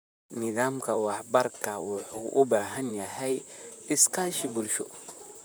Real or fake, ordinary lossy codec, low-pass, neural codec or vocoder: fake; none; none; vocoder, 44.1 kHz, 128 mel bands, Pupu-Vocoder